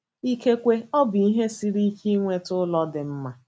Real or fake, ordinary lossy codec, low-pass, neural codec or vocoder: real; none; none; none